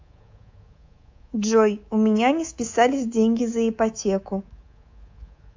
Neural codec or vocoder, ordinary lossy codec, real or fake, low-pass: codec, 24 kHz, 3.1 kbps, DualCodec; AAC, 48 kbps; fake; 7.2 kHz